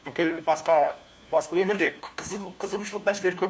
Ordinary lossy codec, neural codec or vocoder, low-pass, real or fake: none; codec, 16 kHz, 1 kbps, FunCodec, trained on LibriTTS, 50 frames a second; none; fake